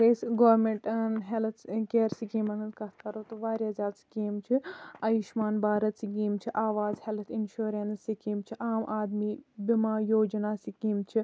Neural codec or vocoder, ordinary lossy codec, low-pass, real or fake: none; none; none; real